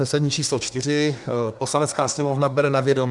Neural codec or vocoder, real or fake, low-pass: codec, 24 kHz, 1 kbps, SNAC; fake; 10.8 kHz